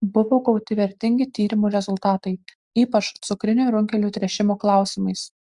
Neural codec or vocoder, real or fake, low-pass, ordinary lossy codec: none; real; 10.8 kHz; Opus, 64 kbps